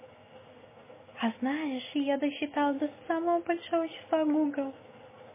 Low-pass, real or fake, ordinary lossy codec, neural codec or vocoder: 3.6 kHz; real; MP3, 16 kbps; none